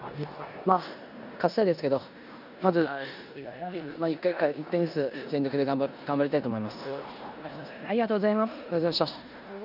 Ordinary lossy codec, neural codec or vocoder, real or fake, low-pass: none; codec, 16 kHz in and 24 kHz out, 0.9 kbps, LongCat-Audio-Codec, four codebook decoder; fake; 5.4 kHz